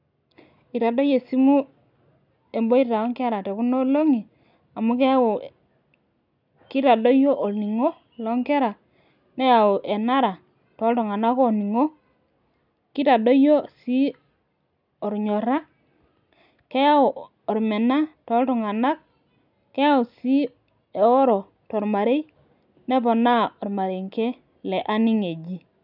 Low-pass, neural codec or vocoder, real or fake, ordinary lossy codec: 5.4 kHz; none; real; none